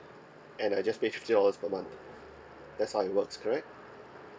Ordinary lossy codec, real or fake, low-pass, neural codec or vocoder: none; real; none; none